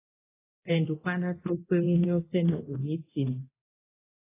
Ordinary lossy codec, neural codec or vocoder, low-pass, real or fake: AAC, 16 kbps; codec, 24 kHz, 0.9 kbps, DualCodec; 3.6 kHz; fake